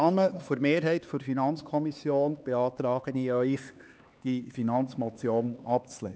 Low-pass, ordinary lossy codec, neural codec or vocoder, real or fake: none; none; codec, 16 kHz, 4 kbps, X-Codec, HuBERT features, trained on LibriSpeech; fake